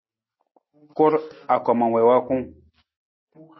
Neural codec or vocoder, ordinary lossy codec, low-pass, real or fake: none; MP3, 24 kbps; 7.2 kHz; real